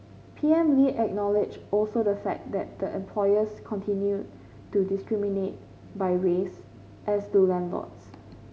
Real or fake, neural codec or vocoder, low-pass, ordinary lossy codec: real; none; none; none